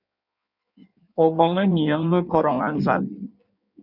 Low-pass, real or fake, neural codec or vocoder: 5.4 kHz; fake; codec, 16 kHz in and 24 kHz out, 1.1 kbps, FireRedTTS-2 codec